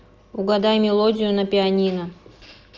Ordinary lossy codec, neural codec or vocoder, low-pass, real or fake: Opus, 24 kbps; none; 7.2 kHz; real